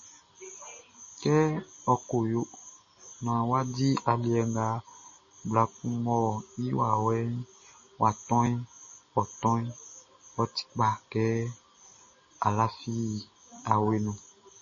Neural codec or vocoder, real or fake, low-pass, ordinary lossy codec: none; real; 7.2 kHz; MP3, 32 kbps